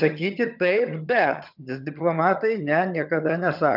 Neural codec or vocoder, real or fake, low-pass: vocoder, 22.05 kHz, 80 mel bands, HiFi-GAN; fake; 5.4 kHz